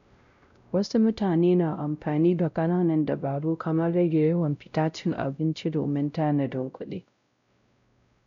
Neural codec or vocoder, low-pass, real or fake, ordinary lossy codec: codec, 16 kHz, 0.5 kbps, X-Codec, WavLM features, trained on Multilingual LibriSpeech; 7.2 kHz; fake; none